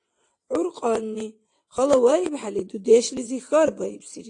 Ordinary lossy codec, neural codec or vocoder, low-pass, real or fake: AAC, 48 kbps; vocoder, 22.05 kHz, 80 mel bands, WaveNeXt; 9.9 kHz; fake